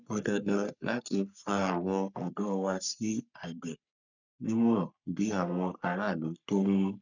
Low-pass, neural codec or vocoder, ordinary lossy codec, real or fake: 7.2 kHz; codec, 44.1 kHz, 3.4 kbps, Pupu-Codec; none; fake